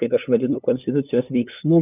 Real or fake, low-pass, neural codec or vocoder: fake; 3.6 kHz; codec, 16 kHz, 8 kbps, FunCodec, trained on LibriTTS, 25 frames a second